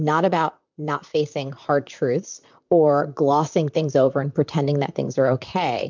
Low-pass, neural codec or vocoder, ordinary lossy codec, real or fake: 7.2 kHz; vocoder, 44.1 kHz, 128 mel bands, Pupu-Vocoder; MP3, 64 kbps; fake